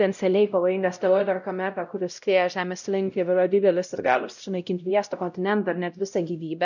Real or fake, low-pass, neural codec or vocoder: fake; 7.2 kHz; codec, 16 kHz, 0.5 kbps, X-Codec, WavLM features, trained on Multilingual LibriSpeech